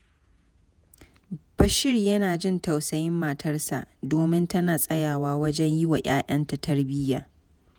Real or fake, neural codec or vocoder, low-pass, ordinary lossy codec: fake; vocoder, 48 kHz, 128 mel bands, Vocos; none; none